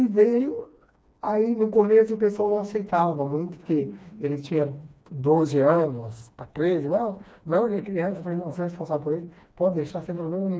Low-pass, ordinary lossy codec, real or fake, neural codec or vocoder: none; none; fake; codec, 16 kHz, 2 kbps, FreqCodec, smaller model